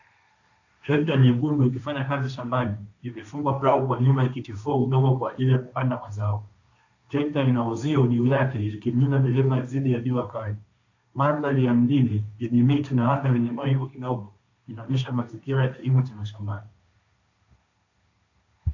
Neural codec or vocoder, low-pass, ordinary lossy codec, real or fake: codec, 16 kHz, 1.1 kbps, Voila-Tokenizer; 7.2 kHz; AAC, 48 kbps; fake